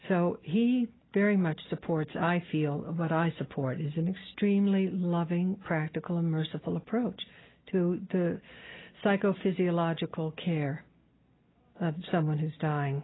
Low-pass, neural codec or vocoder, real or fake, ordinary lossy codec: 7.2 kHz; none; real; AAC, 16 kbps